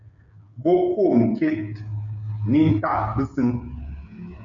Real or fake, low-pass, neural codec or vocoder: fake; 7.2 kHz; codec, 16 kHz, 8 kbps, FreqCodec, smaller model